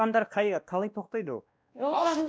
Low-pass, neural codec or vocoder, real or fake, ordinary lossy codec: none; codec, 16 kHz, 1 kbps, X-Codec, WavLM features, trained on Multilingual LibriSpeech; fake; none